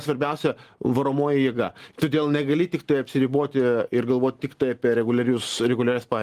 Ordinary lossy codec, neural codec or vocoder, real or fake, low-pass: Opus, 24 kbps; none; real; 14.4 kHz